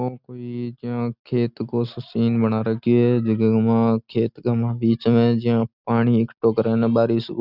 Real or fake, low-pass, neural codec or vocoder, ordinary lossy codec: real; 5.4 kHz; none; none